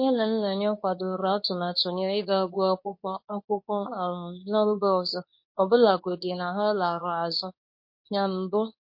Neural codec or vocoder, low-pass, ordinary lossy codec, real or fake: codec, 24 kHz, 0.9 kbps, WavTokenizer, medium speech release version 2; 5.4 kHz; MP3, 32 kbps; fake